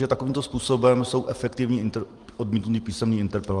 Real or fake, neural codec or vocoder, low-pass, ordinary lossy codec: real; none; 10.8 kHz; Opus, 24 kbps